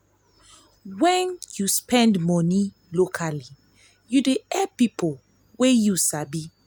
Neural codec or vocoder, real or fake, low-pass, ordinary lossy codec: none; real; none; none